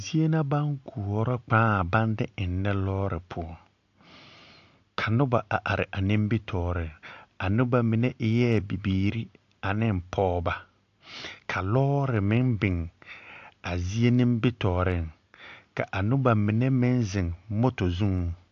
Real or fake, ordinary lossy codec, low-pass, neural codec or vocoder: real; AAC, 48 kbps; 7.2 kHz; none